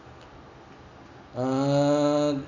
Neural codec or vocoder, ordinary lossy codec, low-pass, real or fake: none; none; 7.2 kHz; real